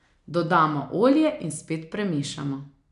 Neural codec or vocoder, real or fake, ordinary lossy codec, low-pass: none; real; none; 10.8 kHz